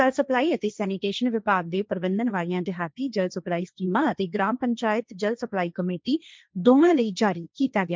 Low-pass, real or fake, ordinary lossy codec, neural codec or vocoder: none; fake; none; codec, 16 kHz, 1.1 kbps, Voila-Tokenizer